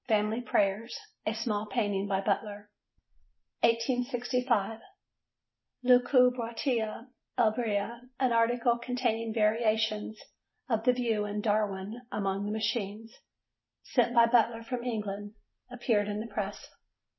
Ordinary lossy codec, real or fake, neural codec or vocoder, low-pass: MP3, 24 kbps; real; none; 7.2 kHz